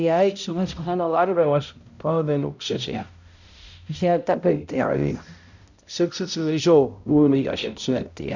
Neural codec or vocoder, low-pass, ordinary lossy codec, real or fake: codec, 16 kHz, 0.5 kbps, X-Codec, HuBERT features, trained on balanced general audio; 7.2 kHz; none; fake